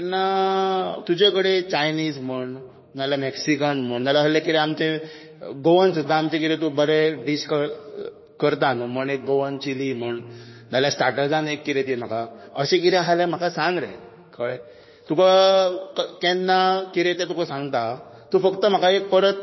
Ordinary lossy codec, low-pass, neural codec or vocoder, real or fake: MP3, 24 kbps; 7.2 kHz; autoencoder, 48 kHz, 32 numbers a frame, DAC-VAE, trained on Japanese speech; fake